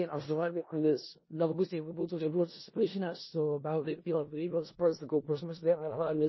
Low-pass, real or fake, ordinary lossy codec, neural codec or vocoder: 7.2 kHz; fake; MP3, 24 kbps; codec, 16 kHz in and 24 kHz out, 0.4 kbps, LongCat-Audio-Codec, four codebook decoder